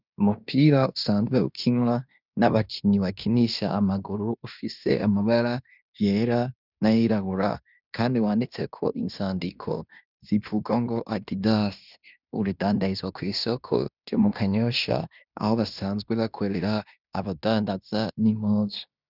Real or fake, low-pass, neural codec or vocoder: fake; 5.4 kHz; codec, 16 kHz in and 24 kHz out, 0.9 kbps, LongCat-Audio-Codec, fine tuned four codebook decoder